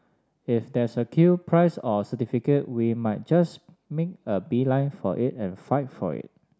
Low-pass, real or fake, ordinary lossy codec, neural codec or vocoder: none; real; none; none